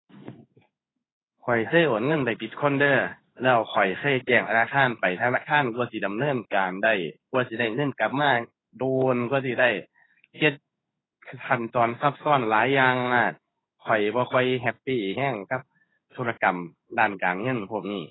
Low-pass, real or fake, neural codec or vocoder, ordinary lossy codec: 7.2 kHz; fake; codec, 16 kHz in and 24 kHz out, 1 kbps, XY-Tokenizer; AAC, 16 kbps